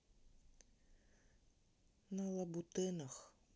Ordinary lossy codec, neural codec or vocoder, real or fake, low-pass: none; none; real; none